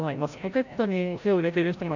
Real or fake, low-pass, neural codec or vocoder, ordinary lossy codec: fake; 7.2 kHz; codec, 16 kHz, 0.5 kbps, FreqCodec, larger model; none